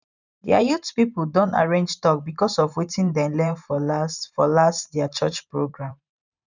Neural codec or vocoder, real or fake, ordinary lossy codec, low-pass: vocoder, 44.1 kHz, 128 mel bands every 512 samples, BigVGAN v2; fake; none; 7.2 kHz